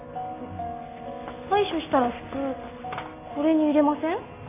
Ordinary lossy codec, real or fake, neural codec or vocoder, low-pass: none; real; none; 3.6 kHz